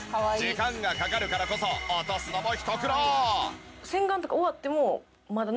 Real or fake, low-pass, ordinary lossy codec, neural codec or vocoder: real; none; none; none